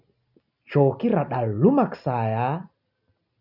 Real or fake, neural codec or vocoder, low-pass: real; none; 5.4 kHz